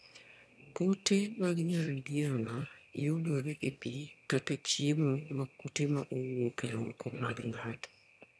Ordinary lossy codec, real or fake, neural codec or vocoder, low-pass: none; fake; autoencoder, 22.05 kHz, a latent of 192 numbers a frame, VITS, trained on one speaker; none